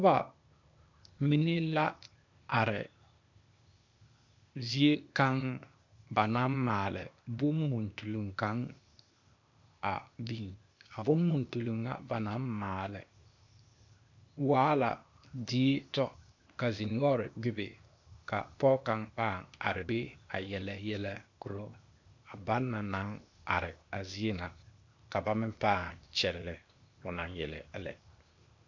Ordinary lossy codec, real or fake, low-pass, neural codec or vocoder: MP3, 64 kbps; fake; 7.2 kHz; codec, 16 kHz, 0.8 kbps, ZipCodec